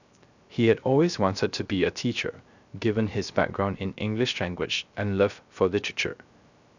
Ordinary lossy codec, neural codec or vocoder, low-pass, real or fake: none; codec, 16 kHz, 0.3 kbps, FocalCodec; 7.2 kHz; fake